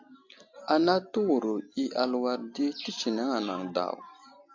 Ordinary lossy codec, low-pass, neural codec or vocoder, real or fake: AAC, 48 kbps; 7.2 kHz; none; real